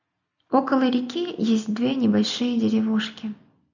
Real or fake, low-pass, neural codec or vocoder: real; 7.2 kHz; none